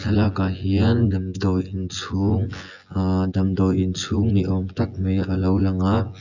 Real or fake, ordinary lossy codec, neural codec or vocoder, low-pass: fake; none; vocoder, 24 kHz, 100 mel bands, Vocos; 7.2 kHz